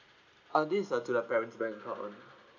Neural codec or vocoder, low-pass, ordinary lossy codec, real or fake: none; 7.2 kHz; none; real